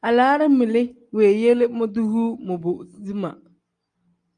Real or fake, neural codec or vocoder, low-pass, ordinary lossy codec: real; none; 9.9 kHz; Opus, 32 kbps